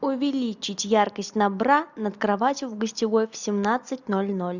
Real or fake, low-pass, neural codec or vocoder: real; 7.2 kHz; none